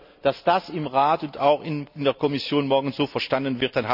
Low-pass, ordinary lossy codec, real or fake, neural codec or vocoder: 5.4 kHz; none; real; none